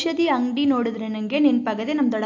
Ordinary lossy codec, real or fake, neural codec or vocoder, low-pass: AAC, 48 kbps; real; none; 7.2 kHz